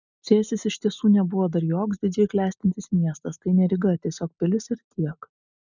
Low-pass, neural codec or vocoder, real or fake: 7.2 kHz; none; real